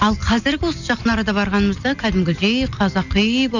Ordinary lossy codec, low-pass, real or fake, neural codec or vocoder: none; 7.2 kHz; real; none